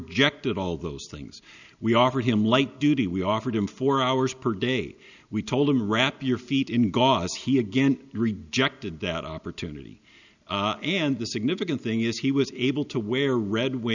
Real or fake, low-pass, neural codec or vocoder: real; 7.2 kHz; none